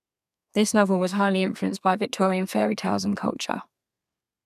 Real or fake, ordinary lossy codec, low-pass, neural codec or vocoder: fake; none; 14.4 kHz; codec, 32 kHz, 1.9 kbps, SNAC